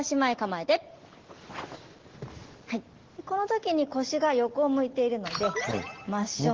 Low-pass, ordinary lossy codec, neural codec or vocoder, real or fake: 7.2 kHz; Opus, 16 kbps; none; real